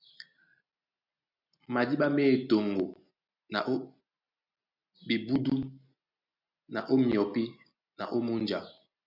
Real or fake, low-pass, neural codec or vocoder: real; 5.4 kHz; none